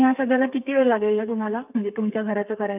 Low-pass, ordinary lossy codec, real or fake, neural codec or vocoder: 3.6 kHz; none; fake; codec, 32 kHz, 1.9 kbps, SNAC